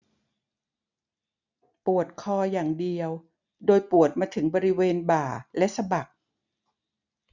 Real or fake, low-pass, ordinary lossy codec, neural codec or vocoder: real; 7.2 kHz; none; none